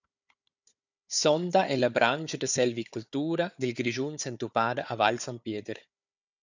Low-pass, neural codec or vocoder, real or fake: 7.2 kHz; codec, 16 kHz, 4 kbps, FunCodec, trained on Chinese and English, 50 frames a second; fake